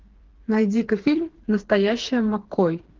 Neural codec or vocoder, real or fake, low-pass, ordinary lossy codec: codec, 16 kHz, 4 kbps, FreqCodec, smaller model; fake; 7.2 kHz; Opus, 16 kbps